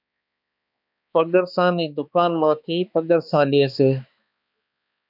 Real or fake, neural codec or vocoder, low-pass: fake; codec, 16 kHz, 2 kbps, X-Codec, HuBERT features, trained on balanced general audio; 5.4 kHz